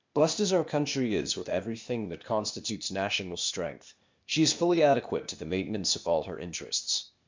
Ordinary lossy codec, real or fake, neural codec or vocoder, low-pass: MP3, 64 kbps; fake; codec, 16 kHz, 0.8 kbps, ZipCodec; 7.2 kHz